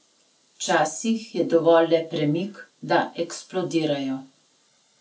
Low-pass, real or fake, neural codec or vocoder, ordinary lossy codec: none; real; none; none